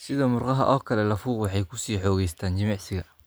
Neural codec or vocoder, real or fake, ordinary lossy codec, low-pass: none; real; none; none